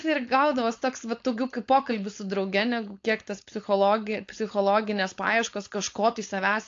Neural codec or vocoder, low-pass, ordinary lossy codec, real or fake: codec, 16 kHz, 4.8 kbps, FACodec; 7.2 kHz; AAC, 48 kbps; fake